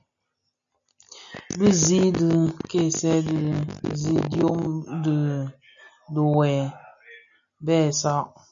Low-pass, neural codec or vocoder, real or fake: 7.2 kHz; none; real